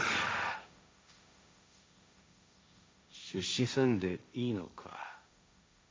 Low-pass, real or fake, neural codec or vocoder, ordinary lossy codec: none; fake; codec, 16 kHz, 1.1 kbps, Voila-Tokenizer; none